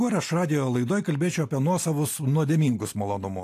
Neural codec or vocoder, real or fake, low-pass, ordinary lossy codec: none; real; 14.4 kHz; AAC, 64 kbps